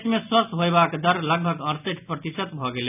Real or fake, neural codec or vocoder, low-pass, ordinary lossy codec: real; none; 3.6 kHz; none